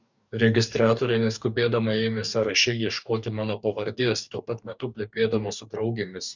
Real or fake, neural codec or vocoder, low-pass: fake; codec, 44.1 kHz, 2.6 kbps, DAC; 7.2 kHz